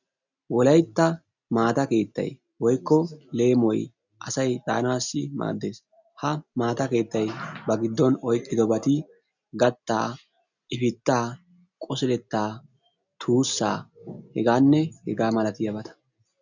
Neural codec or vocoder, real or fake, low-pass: none; real; 7.2 kHz